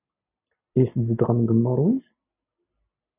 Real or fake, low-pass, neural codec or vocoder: real; 3.6 kHz; none